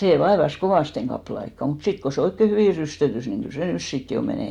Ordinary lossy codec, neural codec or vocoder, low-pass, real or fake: MP3, 96 kbps; none; 19.8 kHz; real